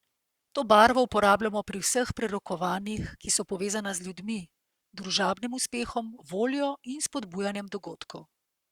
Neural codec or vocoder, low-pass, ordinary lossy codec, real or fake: codec, 44.1 kHz, 7.8 kbps, Pupu-Codec; 19.8 kHz; Opus, 64 kbps; fake